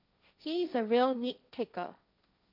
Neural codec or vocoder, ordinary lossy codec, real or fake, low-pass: codec, 16 kHz, 1.1 kbps, Voila-Tokenizer; none; fake; 5.4 kHz